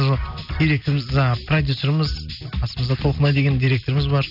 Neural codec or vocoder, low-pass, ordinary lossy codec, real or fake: none; 5.4 kHz; none; real